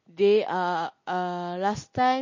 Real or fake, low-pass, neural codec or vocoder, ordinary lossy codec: real; 7.2 kHz; none; MP3, 32 kbps